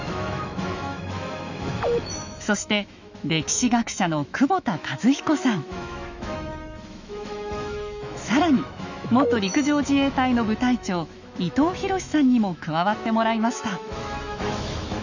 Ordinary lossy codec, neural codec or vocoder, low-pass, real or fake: none; autoencoder, 48 kHz, 128 numbers a frame, DAC-VAE, trained on Japanese speech; 7.2 kHz; fake